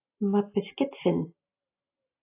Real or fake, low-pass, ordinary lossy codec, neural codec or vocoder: real; 3.6 kHz; AAC, 24 kbps; none